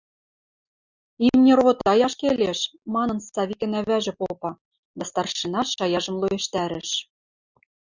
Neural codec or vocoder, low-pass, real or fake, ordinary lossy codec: none; 7.2 kHz; real; Opus, 64 kbps